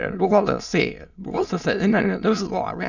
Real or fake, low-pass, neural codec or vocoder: fake; 7.2 kHz; autoencoder, 22.05 kHz, a latent of 192 numbers a frame, VITS, trained on many speakers